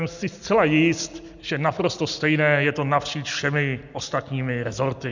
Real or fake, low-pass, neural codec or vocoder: real; 7.2 kHz; none